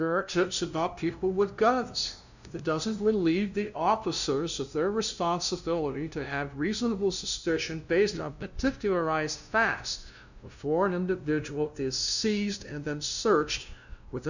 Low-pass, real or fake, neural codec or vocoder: 7.2 kHz; fake; codec, 16 kHz, 0.5 kbps, FunCodec, trained on LibriTTS, 25 frames a second